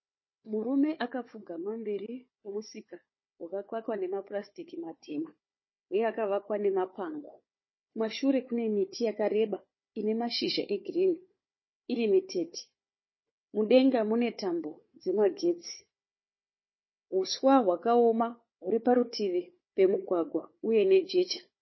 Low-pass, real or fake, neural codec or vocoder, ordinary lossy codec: 7.2 kHz; fake; codec, 16 kHz, 4 kbps, FunCodec, trained on Chinese and English, 50 frames a second; MP3, 24 kbps